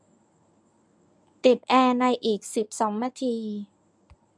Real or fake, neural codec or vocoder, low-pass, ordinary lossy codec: fake; codec, 24 kHz, 0.9 kbps, WavTokenizer, medium speech release version 1; none; none